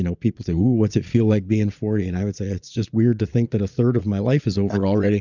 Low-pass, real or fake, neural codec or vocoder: 7.2 kHz; fake; vocoder, 22.05 kHz, 80 mel bands, Vocos